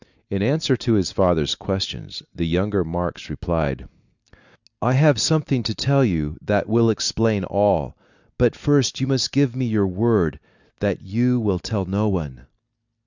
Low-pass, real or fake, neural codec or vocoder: 7.2 kHz; real; none